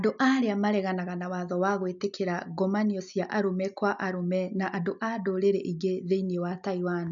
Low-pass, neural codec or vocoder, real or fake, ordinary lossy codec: 7.2 kHz; none; real; none